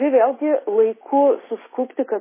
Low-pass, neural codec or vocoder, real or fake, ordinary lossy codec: 3.6 kHz; none; real; MP3, 16 kbps